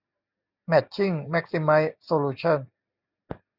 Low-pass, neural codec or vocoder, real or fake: 5.4 kHz; none; real